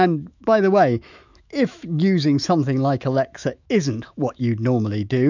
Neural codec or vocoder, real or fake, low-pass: autoencoder, 48 kHz, 128 numbers a frame, DAC-VAE, trained on Japanese speech; fake; 7.2 kHz